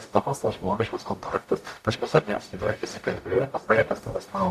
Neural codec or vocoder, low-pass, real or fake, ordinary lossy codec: codec, 44.1 kHz, 0.9 kbps, DAC; 14.4 kHz; fake; AAC, 96 kbps